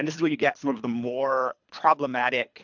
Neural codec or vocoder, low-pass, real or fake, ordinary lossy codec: codec, 24 kHz, 3 kbps, HILCodec; 7.2 kHz; fake; MP3, 64 kbps